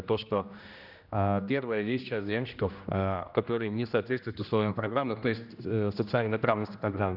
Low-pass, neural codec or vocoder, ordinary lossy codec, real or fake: 5.4 kHz; codec, 16 kHz, 1 kbps, X-Codec, HuBERT features, trained on general audio; none; fake